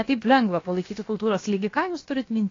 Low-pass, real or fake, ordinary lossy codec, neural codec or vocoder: 7.2 kHz; fake; AAC, 32 kbps; codec, 16 kHz, about 1 kbps, DyCAST, with the encoder's durations